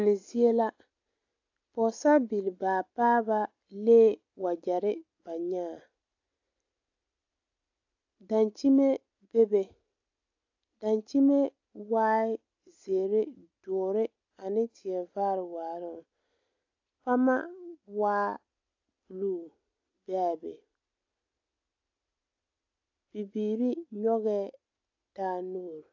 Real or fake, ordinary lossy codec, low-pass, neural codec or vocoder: real; AAC, 48 kbps; 7.2 kHz; none